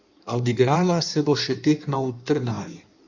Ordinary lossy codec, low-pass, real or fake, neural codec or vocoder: none; 7.2 kHz; fake; codec, 16 kHz in and 24 kHz out, 1.1 kbps, FireRedTTS-2 codec